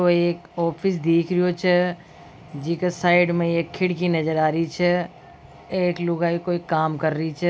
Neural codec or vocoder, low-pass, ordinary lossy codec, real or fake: none; none; none; real